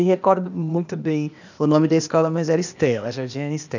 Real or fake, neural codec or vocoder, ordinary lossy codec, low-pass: fake; codec, 16 kHz, 0.8 kbps, ZipCodec; none; 7.2 kHz